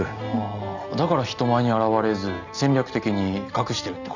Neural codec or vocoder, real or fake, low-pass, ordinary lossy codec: none; real; 7.2 kHz; none